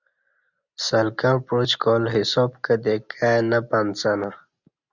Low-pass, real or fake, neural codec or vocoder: 7.2 kHz; real; none